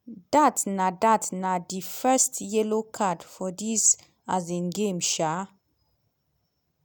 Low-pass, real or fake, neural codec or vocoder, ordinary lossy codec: none; real; none; none